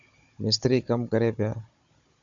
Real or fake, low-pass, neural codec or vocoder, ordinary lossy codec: fake; 7.2 kHz; codec, 16 kHz, 16 kbps, FunCodec, trained on Chinese and English, 50 frames a second; AAC, 64 kbps